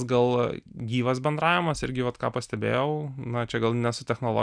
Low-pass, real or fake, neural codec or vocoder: 9.9 kHz; real; none